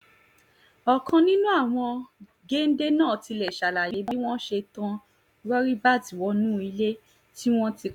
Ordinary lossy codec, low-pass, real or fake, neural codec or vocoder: none; 19.8 kHz; real; none